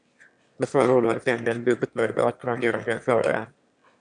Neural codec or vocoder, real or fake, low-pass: autoencoder, 22.05 kHz, a latent of 192 numbers a frame, VITS, trained on one speaker; fake; 9.9 kHz